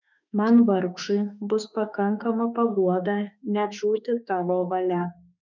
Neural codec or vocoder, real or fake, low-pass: autoencoder, 48 kHz, 32 numbers a frame, DAC-VAE, trained on Japanese speech; fake; 7.2 kHz